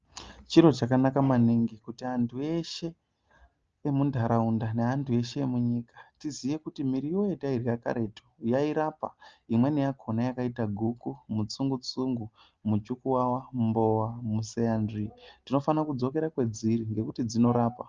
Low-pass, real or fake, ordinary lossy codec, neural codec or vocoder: 7.2 kHz; real; Opus, 24 kbps; none